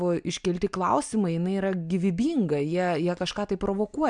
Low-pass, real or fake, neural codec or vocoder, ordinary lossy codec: 9.9 kHz; real; none; MP3, 96 kbps